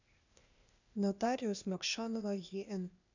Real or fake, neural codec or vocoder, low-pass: fake; codec, 16 kHz, 0.8 kbps, ZipCodec; 7.2 kHz